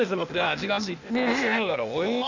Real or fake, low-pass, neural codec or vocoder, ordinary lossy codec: fake; 7.2 kHz; codec, 16 kHz, 0.8 kbps, ZipCodec; none